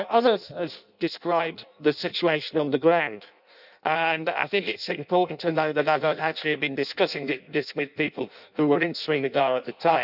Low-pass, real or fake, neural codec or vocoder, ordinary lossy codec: 5.4 kHz; fake; codec, 16 kHz in and 24 kHz out, 0.6 kbps, FireRedTTS-2 codec; none